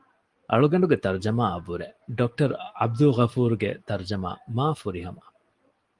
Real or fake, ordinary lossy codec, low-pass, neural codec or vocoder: real; Opus, 24 kbps; 10.8 kHz; none